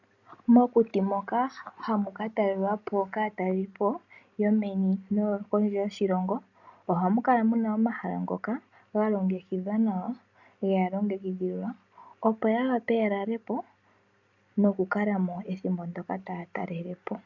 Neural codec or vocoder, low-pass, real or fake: none; 7.2 kHz; real